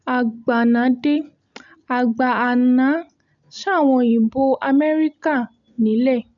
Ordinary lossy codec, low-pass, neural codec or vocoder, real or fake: none; 7.2 kHz; none; real